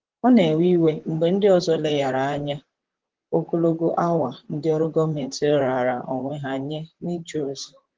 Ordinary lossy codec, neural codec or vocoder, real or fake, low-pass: Opus, 16 kbps; vocoder, 44.1 kHz, 128 mel bands, Pupu-Vocoder; fake; 7.2 kHz